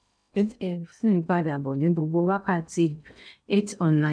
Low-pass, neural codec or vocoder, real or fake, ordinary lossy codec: 9.9 kHz; codec, 16 kHz in and 24 kHz out, 0.6 kbps, FocalCodec, streaming, 2048 codes; fake; none